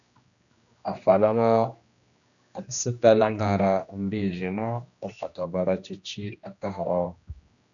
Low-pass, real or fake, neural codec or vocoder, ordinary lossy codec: 7.2 kHz; fake; codec, 16 kHz, 1 kbps, X-Codec, HuBERT features, trained on general audio; MP3, 96 kbps